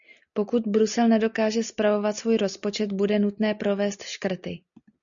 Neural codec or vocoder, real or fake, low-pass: none; real; 7.2 kHz